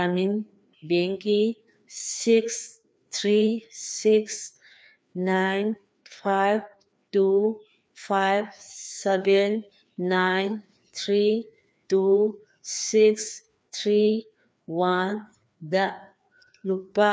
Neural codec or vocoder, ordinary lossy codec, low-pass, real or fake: codec, 16 kHz, 2 kbps, FreqCodec, larger model; none; none; fake